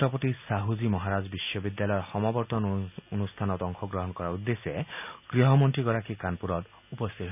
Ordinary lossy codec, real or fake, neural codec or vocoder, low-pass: none; real; none; 3.6 kHz